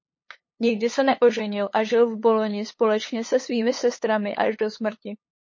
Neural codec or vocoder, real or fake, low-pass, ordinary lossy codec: codec, 16 kHz, 8 kbps, FunCodec, trained on LibriTTS, 25 frames a second; fake; 7.2 kHz; MP3, 32 kbps